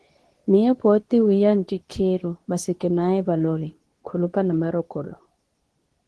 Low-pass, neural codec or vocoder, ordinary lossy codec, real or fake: 10.8 kHz; codec, 24 kHz, 0.9 kbps, WavTokenizer, medium speech release version 2; Opus, 16 kbps; fake